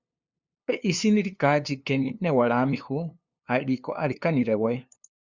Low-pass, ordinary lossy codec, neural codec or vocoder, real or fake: 7.2 kHz; Opus, 64 kbps; codec, 16 kHz, 2 kbps, FunCodec, trained on LibriTTS, 25 frames a second; fake